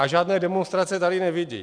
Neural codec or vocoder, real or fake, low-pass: none; real; 9.9 kHz